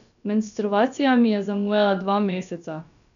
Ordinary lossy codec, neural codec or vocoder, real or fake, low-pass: none; codec, 16 kHz, about 1 kbps, DyCAST, with the encoder's durations; fake; 7.2 kHz